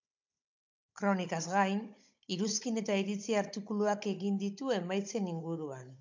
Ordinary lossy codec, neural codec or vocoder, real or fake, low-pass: MP3, 64 kbps; codec, 16 kHz, 16 kbps, FunCodec, trained on Chinese and English, 50 frames a second; fake; 7.2 kHz